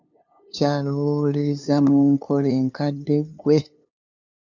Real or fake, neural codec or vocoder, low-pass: fake; codec, 16 kHz, 2 kbps, FunCodec, trained on LibriTTS, 25 frames a second; 7.2 kHz